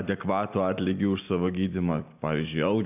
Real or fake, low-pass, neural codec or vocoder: real; 3.6 kHz; none